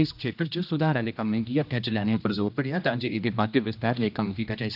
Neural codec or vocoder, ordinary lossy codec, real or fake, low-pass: codec, 16 kHz, 1 kbps, X-Codec, HuBERT features, trained on general audio; none; fake; 5.4 kHz